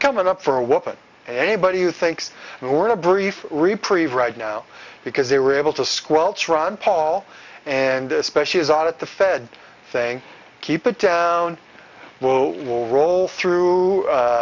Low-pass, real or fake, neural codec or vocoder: 7.2 kHz; real; none